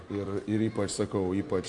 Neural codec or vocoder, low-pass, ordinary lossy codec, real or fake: none; 10.8 kHz; MP3, 64 kbps; real